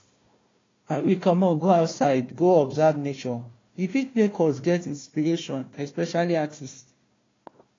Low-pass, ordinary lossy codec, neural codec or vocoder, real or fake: 7.2 kHz; AAC, 32 kbps; codec, 16 kHz, 1 kbps, FunCodec, trained on Chinese and English, 50 frames a second; fake